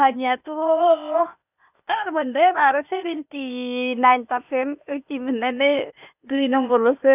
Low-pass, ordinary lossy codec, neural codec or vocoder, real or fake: 3.6 kHz; none; codec, 16 kHz, 0.8 kbps, ZipCodec; fake